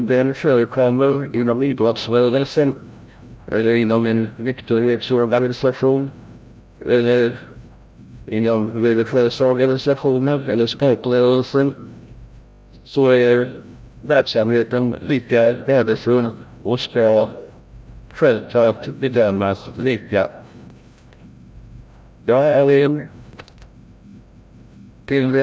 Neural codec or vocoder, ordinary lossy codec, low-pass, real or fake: codec, 16 kHz, 0.5 kbps, FreqCodec, larger model; none; none; fake